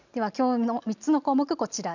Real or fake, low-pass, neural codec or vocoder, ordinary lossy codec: real; 7.2 kHz; none; none